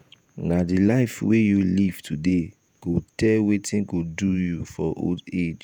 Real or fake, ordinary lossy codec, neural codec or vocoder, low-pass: fake; none; vocoder, 48 kHz, 128 mel bands, Vocos; none